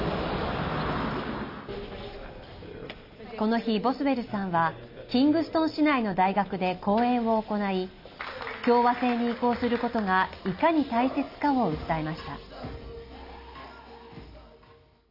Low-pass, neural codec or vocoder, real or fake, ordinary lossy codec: 5.4 kHz; none; real; none